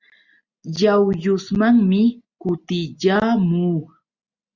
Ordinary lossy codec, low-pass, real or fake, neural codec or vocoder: Opus, 64 kbps; 7.2 kHz; real; none